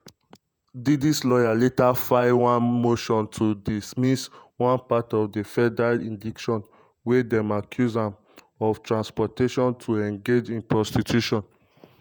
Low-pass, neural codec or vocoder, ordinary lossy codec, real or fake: none; vocoder, 48 kHz, 128 mel bands, Vocos; none; fake